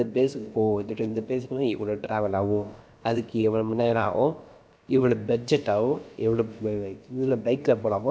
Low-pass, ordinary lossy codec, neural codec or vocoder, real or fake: none; none; codec, 16 kHz, about 1 kbps, DyCAST, with the encoder's durations; fake